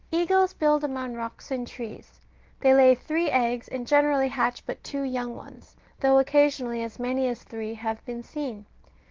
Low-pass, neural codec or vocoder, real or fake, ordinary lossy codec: 7.2 kHz; none; real; Opus, 16 kbps